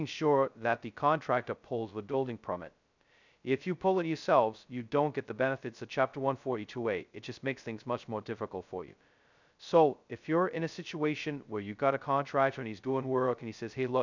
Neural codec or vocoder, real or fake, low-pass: codec, 16 kHz, 0.2 kbps, FocalCodec; fake; 7.2 kHz